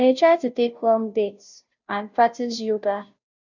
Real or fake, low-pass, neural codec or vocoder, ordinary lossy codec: fake; 7.2 kHz; codec, 16 kHz, 0.5 kbps, FunCodec, trained on Chinese and English, 25 frames a second; none